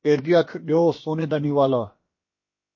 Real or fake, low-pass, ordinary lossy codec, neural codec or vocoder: fake; 7.2 kHz; MP3, 32 kbps; codec, 16 kHz, about 1 kbps, DyCAST, with the encoder's durations